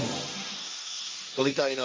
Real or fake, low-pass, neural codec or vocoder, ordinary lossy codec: fake; none; codec, 16 kHz, 1.1 kbps, Voila-Tokenizer; none